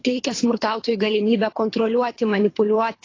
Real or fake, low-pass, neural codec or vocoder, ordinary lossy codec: fake; 7.2 kHz; codec, 24 kHz, 3 kbps, HILCodec; AAC, 32 kbps